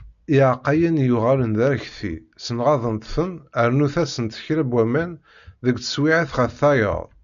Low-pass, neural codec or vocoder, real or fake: 7.2 kHz; none; real